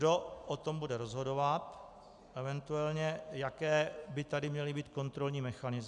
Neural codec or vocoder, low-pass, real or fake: none; 10.8 kHz; real